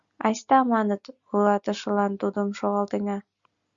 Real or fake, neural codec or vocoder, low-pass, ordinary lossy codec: real; none; 7.2 kHz; Opus, 64 kbps